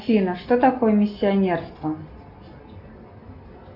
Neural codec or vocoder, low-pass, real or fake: none; 5.4 kHz; real